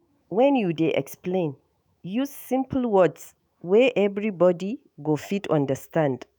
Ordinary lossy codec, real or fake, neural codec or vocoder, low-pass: none; fake; autoencoder, 48 kHz, 128 numbers a frame, DAC-VAE, trained on Japanese speech; none